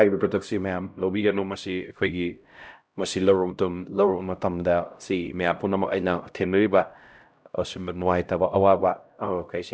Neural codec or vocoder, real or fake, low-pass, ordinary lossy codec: codec, 16 kHz, 0.5 kbps, X-Codec, HuBERT features, trained on LibriSpeech; fake; none; none